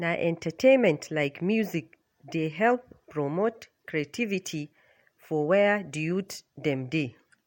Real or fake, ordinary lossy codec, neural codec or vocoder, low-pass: real; MP3, 64 kbps; none; 19.8 kHz